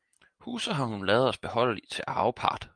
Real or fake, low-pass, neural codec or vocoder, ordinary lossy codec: real; 9.9 kHz; none; Opus, 32 kbps